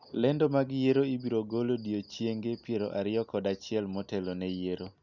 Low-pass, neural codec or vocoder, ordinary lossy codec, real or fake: 7.2 kHz; none; none; real